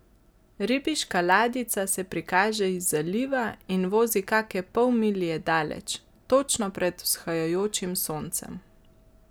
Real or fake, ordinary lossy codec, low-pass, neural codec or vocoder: real; none; none; none